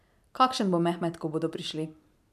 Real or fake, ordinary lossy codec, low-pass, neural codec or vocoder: fake; none; 14.4 kHz; vocoder, 44.1 kHz, 128 mel bands every 512 samples, BigVGAN v2